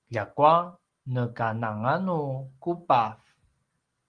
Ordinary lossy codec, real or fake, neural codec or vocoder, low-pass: Opus, 24 kbps; real; none; 9.9 kHz